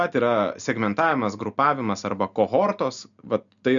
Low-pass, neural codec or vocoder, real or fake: 7.2 kHz; none; real